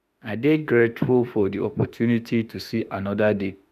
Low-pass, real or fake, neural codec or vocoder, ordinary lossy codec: 14.4 kHz; fake; autoencoder, 48 kHz, 32 numbers a frame, DAC-VAE, trained on Japanese speech; none